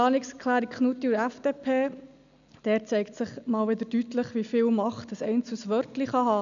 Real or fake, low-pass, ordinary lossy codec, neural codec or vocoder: real; 7.2 kHz; none; none